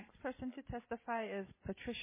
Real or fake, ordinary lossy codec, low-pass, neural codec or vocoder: real; MP3, 16 kbps; 3.6 kHz; none